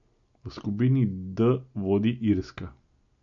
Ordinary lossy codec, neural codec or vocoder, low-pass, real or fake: MP3, 48 kbps; none; 7.2 kHz; real